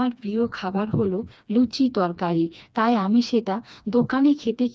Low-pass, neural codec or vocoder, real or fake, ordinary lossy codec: none; codec, 16 kHz, 2 kbps, FreqCodec, smaller model; fake; none